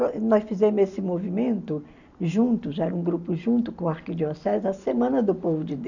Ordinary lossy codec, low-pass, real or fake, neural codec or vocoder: none; 7.2 kHz; real; none